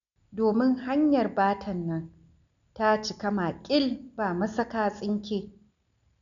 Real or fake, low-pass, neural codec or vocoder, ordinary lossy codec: real; 7.2 kHz; none; none